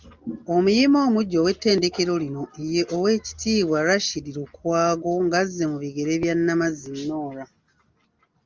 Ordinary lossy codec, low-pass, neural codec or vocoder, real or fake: Opus, 32 kbps; 7.2 kHz; none; real